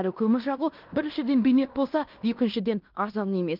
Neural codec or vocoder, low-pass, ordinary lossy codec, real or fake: codec, 16 kHz in and 24 kHz out, 0.9 kbps, LongCat-Audio-Codec, fine tuned four codebook decoder; 5.4 kHz; Opus, 24 kbps; fake